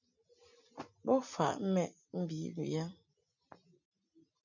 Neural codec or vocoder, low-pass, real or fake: none; 7.2 kHz; real